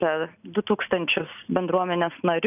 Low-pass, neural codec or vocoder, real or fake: 3.6 kHz; none; real